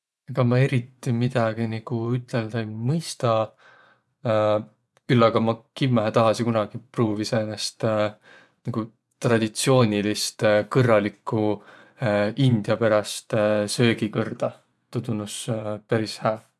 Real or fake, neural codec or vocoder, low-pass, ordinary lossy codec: fake; vocoder, 24 kHz, 100 mel bands, Vocos; none; none